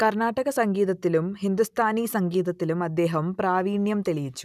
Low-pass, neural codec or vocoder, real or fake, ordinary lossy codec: 14.4 kHz; none; real; none